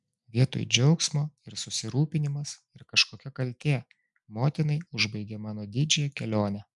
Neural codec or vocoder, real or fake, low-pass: none; real; 10.8 kHz